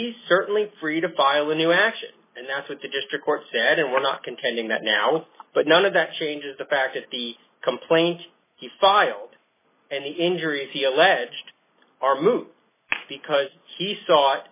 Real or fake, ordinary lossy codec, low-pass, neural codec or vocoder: real; MP3, 16 kbps; 3.6 kHz; none